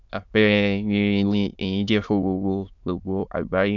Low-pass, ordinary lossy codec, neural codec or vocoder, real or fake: 7.2 kHz; none; autoencoder, 22.05 kHz, a latent of 192 numbers a frame, VITS, trained on many speakers; fake